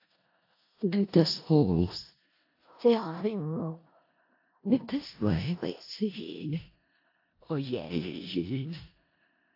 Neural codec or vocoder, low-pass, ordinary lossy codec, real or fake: codec, 16 kHz in and 24 kHz out, 0.4 kbps, LongCat-Audio-Codec, four codebook decoder; 5.4 kHz; AAC, 32 kbps; fake